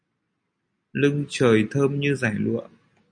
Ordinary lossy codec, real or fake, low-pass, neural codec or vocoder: MP3, 96 kbps; real; 9.9 kHz; none